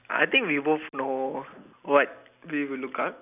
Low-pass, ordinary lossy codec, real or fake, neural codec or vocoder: 3.6 kHz; none; real; none